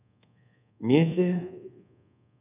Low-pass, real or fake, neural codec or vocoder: 3.6 kHz; fake; codec, 24 kHz, 1.2 kbps, DualCodec